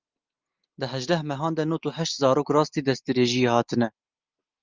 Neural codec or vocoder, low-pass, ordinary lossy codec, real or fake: none; 7.2 kHz; Opus, 24 kbps; real